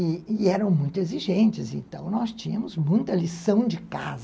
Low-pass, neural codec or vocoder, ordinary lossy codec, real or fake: none; none; none; real